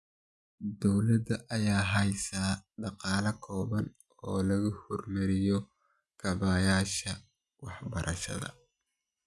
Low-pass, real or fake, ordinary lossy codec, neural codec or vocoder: none; real; none; none